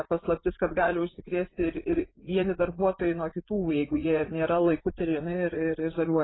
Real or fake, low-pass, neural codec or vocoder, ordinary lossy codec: fake; 7.2 kHz; vocoder, 44.1 kHz, 80 mel bands, Vocos; AAC, 16 kbps